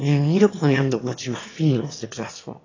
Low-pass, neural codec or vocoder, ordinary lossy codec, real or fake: 7.2 kHz; autoencoder, 22.05 kHz, a latent of 192 numbers a frame, VITS, trained on one speaker; AAC, 32 kbps; fake